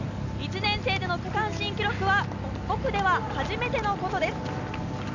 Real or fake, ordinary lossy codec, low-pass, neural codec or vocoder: real; none; 7.2 kHz; none